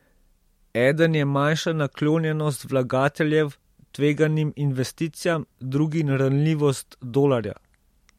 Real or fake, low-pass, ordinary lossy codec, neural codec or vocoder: real; 19.8 kHz; MP3, 64 kbps; none